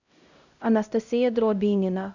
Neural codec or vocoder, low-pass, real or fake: codec, 16 kHz, 0.5 kbps, X-Codec, HuBERT features, trained on LibriSpeech; 7.2 kHz; fake